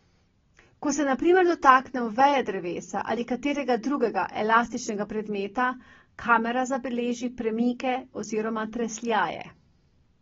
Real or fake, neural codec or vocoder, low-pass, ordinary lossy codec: real; none; 7.2 kHz; AAC, 24 kbps